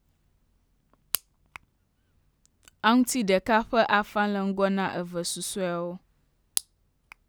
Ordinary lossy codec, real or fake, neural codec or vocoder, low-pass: none; real; none; none